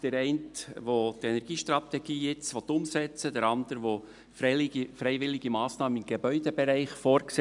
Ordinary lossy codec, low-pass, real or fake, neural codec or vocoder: none; 10.8 kHz; real; none